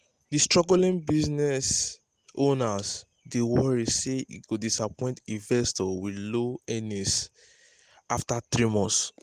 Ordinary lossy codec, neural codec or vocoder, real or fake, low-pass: Opus, 24 kbps; none; real; 14.4 kHz